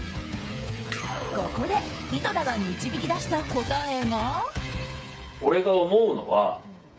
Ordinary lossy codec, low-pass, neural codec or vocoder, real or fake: none; none; codec, 16 kHz, 8 kbps, FreqCodec, smaller model; fake